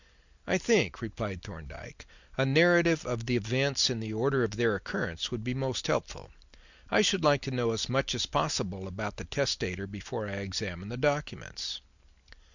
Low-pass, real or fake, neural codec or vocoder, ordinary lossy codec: 7.2 kHz; real; none; Opus, 64 kbps